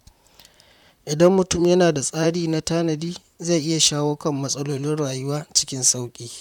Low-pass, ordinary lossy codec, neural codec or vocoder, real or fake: 19.8 kHz; none; vocoder, 44.1 kHz, 128 mel bands, Pupu-Vocoder; fake